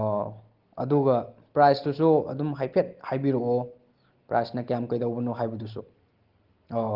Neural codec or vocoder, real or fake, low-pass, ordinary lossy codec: none; real; 5.4 kHz; Opus, 16 kbps